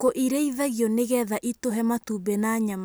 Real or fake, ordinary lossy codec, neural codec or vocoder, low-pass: real; none; none; none